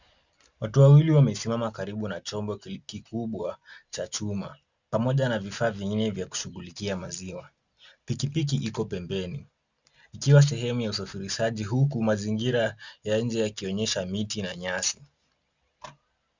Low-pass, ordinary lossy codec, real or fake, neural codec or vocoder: 7.2 kHz; Opus, 64 kbps; real; none